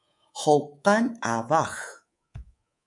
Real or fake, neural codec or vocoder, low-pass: fake; codec, 24 kHz, 3.1 kbps, DualCodec; 10.8 kHz